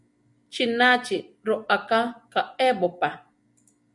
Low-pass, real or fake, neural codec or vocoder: 10.8 kHz; real; none